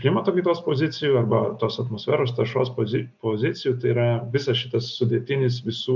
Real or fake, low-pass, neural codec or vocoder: real; 7.2 kHz; none